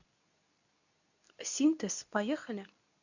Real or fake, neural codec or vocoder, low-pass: fake; codec, 24 kHz, 0.9 kbps, WavTokenizer, medium speech release version 2; 7.2 kHz